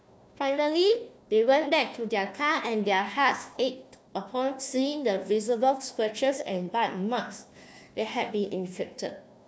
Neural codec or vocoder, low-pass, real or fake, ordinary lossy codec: codec, 16 kHz, 1 kbps, FunCodec, trained on Chinese and English, 50 frames a second; none; fake; none